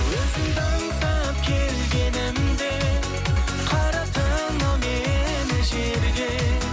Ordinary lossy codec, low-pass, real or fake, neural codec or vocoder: none; none; real; none